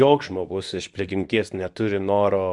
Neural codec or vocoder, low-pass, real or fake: codec, 24 kHz, 0.9 kbps, WavTokenizer, medium speech release version 2; 10.8 kHz; fake